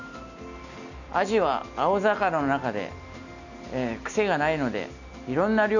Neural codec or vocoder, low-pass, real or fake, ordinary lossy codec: none; 7.2 kHz; real; none